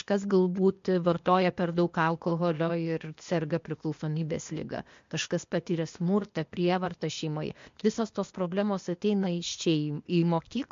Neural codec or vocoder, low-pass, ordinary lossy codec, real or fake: codec, 16 kHz, 0.8 kbps, ZipCodec; 7.2 kHz; MP3, 48 kbps; fake